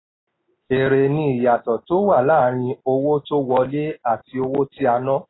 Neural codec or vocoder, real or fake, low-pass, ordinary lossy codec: none; real; 7.2 kHz; AAC, 16 kbps